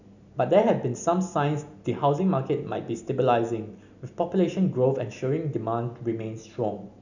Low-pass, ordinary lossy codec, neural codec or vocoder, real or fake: 7.2 kHz; none; none; real